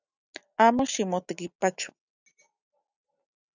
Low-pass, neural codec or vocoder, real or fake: 7.2 kHz; none; real